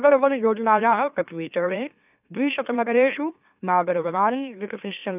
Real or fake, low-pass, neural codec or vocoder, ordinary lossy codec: fake; 3.6 kHz; autoencoder, 44.1 kHz, a latent of 192 numbers a frame, MeloTTS; none